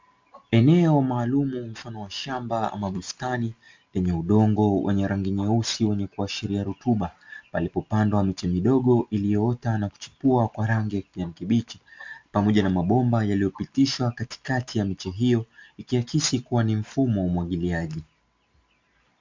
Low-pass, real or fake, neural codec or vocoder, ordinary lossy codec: 7.2 kHz; real; none; MP3, 64 kbps